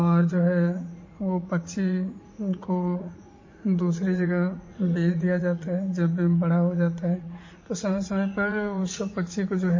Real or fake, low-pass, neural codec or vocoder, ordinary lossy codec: fake; 7.2 kHz; vocoder, 44.1 kHz, 80 mel bands, Vocos; MP3, 32 kbps